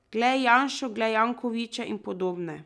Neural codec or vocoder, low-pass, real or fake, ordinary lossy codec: none; none; real; none